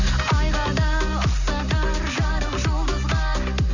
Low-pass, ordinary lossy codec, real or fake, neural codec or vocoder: 7.2 kHz; none; real; none